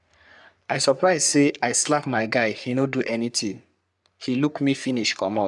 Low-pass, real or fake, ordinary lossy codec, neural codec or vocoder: 10.8 kHz; fake; none; codec, 44.1 kHz, 3.4 kbps, Pupu-Codec